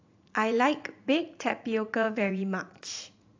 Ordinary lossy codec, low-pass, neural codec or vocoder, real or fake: AAC, 48 kbps; 7.2 kHz; vocoder, 44.1 kHz, 80 mel bands, Vocos; fake